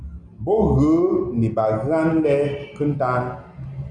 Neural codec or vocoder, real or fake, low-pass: none; real; 9.9 kHz